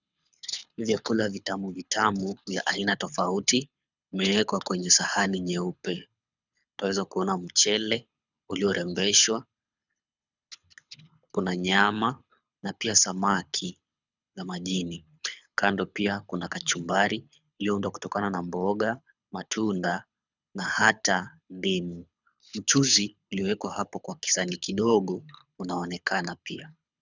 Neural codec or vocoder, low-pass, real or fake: codec, 24 kHz, 6 kbps, HILCodec; 7.2 kHz; fake